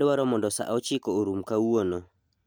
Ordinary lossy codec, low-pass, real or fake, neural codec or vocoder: none; none; real; none